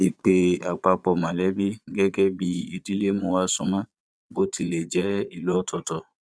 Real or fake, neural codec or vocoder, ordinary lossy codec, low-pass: fake; vocoder, 22.05 kHz, 80 mel bands, Vocos; none; none